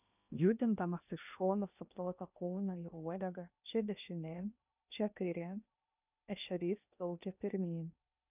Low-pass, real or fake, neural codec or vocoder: 3.6 kHz; fake; codec, 16 kHz in and 24 kHz out, 0.8 kbps, FocalCodec, streaming, 65536 codes